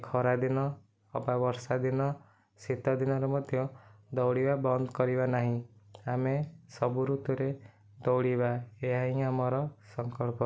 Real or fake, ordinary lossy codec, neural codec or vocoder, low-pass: real; none; none; none